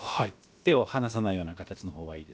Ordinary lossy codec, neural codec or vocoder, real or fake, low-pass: none; codec, 16 kHz, about 1 kbps, DyCAST, with the encoder's durations; fake; none